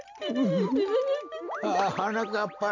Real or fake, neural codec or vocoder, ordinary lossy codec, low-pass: real; none; AAC, 48 kbps; 7.2 kHz